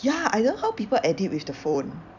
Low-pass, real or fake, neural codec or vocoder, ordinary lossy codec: 7.2 kHz; fake; vocoder, 44.1 kHz, 128 mel bands every 256 samples, BigVGAN v2; none